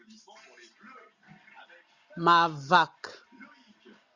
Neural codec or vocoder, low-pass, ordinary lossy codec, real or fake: none; 7.2 kHz; Opus, 64 kbps; real